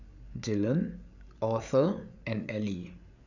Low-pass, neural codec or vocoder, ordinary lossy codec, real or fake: 7.2 kHz; codec, 16 kHz, 8 kbps, FreqCodec, larger model; none; fake